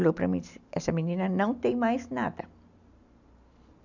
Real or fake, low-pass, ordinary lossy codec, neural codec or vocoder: real; 7.2 kHz; none; none